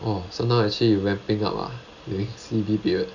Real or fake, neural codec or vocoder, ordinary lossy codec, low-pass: real; none; none; 7.2 kHz